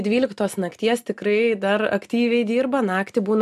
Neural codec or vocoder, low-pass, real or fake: none; 14.4 kHz; real